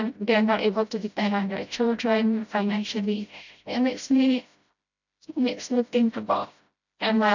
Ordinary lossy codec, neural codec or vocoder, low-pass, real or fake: none; codec, 16 kHz, 0.5 kbps, FreqCodec, smaller model; 7.2 kHz; fake